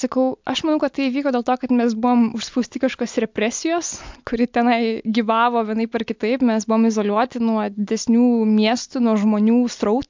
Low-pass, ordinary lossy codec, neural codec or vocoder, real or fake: 7.2 kHz; MP3, 64 kbps; none; real